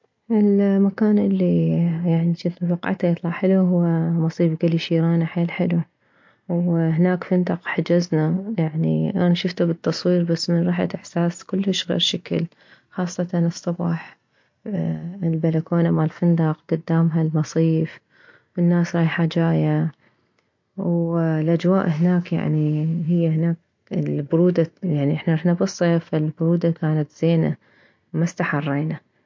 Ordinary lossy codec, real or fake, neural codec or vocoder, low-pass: MP3, 48 kbps; real; none; 7.2 kHz